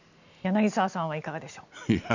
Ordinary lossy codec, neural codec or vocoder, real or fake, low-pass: none; none; real; 7.2 kHz